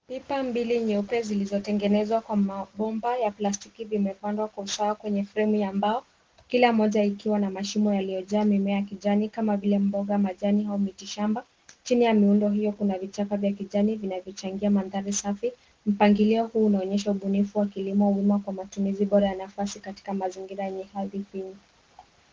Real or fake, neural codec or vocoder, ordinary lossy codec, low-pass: real; none; Opus, 16 kbps; 7.2 kHz